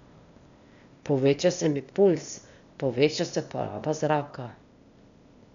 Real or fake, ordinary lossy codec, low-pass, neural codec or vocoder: fake; none; 7.2 kHz; codec, 16 kHz, 0.8 kbps, ZipCodec